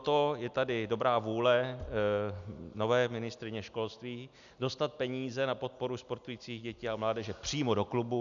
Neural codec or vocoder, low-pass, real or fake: none; 7.2 kHz; real